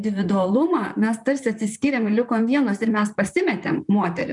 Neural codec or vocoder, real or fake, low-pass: vocoder, 44.1 kHz, 128 mel bands, Pupu-Vocoder; fake; 10.8 kHz